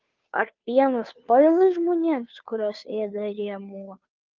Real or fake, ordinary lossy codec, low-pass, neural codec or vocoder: fake; Opus, 24 kbps; 7.2 kHz; codec, 16 kHz, 2 kbps, FunCodec, trained on Chinese and English, 25 frames a second